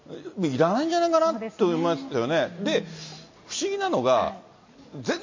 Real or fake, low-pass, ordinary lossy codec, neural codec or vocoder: real; 7.2 kHz; MP3, 32 kbps; none